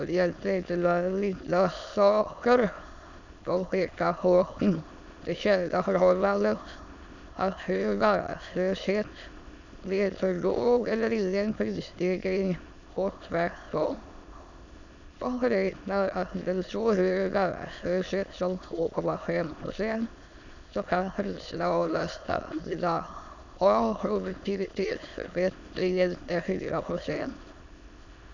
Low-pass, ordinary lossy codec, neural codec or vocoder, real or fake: 7.2 kHz; none; autoencoder, 22.05 kHz, a latent of 192 numbers a frame, VITS, trained on many speakers; fake